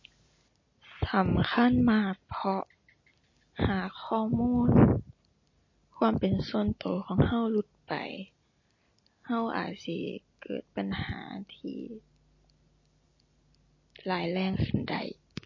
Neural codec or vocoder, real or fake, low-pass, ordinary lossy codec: none; real; 7.2 kHz; MP3, 32 kbps